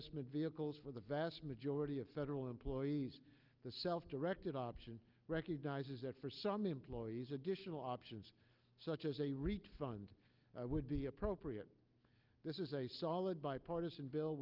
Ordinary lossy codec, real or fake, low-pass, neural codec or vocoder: Opus, 24 kbps; real; 5.4 kHz; none